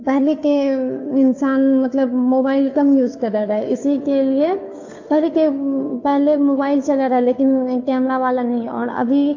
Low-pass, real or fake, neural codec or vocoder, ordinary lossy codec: 7.2 kHz; fake; codec, 16 kHz, 2 kbps, FunCodec, trained on Chinese and English, 25 frames a second; AAC, 48 kbps